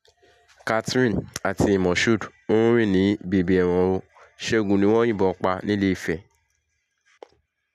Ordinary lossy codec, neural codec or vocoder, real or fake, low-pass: none; none; real; 14.4 kHz